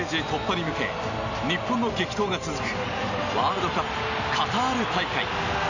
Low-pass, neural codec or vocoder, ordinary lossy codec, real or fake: 7.2 kHz; none; none; real